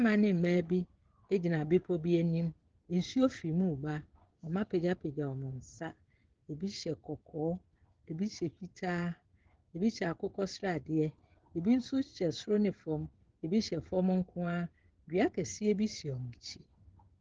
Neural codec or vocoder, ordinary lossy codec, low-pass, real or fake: codec, 16 kHz, 16 kbps, FreqCodec, smaller model; Opus, 16 kbps; 7.2 kHz; fake